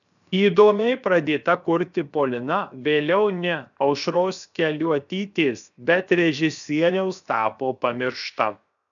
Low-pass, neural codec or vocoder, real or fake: 7.2 kHz; codec, 16 kHz, 0.7 kbps, FocalCodec; fake